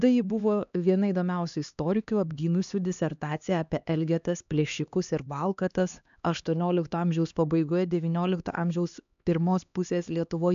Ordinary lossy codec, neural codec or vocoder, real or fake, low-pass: AAC, 96 kbps; codec, 16 kHz, 2 kbps, X-Codec, HuBERT features, trained on LibriSpeech; fake; 7.2 kHz